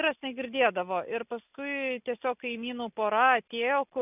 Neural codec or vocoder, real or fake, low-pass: none; real; 3.6 kHz